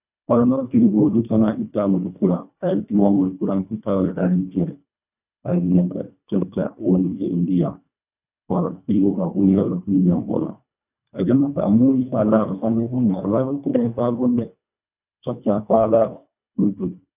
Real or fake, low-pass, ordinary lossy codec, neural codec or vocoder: fake; 3.6 kHz; none; codec, 24 kHz, 1.5 kbps, HILCodec